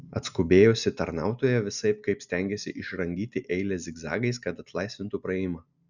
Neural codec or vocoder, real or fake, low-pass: none; real; 7.2 kHz